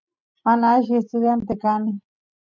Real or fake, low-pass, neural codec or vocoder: real; 7.2 kHz; none